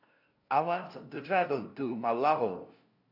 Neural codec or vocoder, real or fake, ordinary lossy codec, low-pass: codec, 16 kHz, 0.5 kbps, FunCodec, trained on LibriTTS, 25 frames a second; fake; AAC, 48 kbps; 5.4 kHz